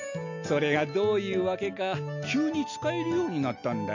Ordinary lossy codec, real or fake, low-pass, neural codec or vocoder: none; real; 7.2 kHz; none